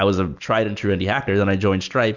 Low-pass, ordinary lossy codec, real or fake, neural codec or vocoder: 7.2 kHz; MP3, 64 kbps; real; none